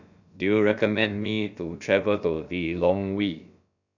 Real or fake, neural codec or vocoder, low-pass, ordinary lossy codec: fake; codec, 16 kHz, about 1 kbps, DyCAST, with the encoder's durations; 7.2 kHz; none